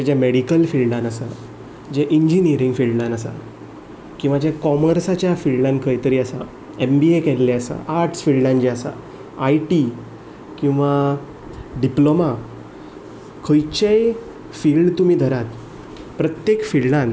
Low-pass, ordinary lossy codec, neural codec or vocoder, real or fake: none; none; none; real